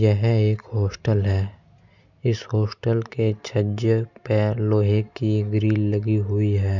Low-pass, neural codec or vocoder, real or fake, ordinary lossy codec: 7.2 kHz; none; real; none